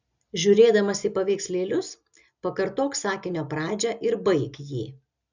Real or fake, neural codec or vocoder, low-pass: real; none; 7.2 kHz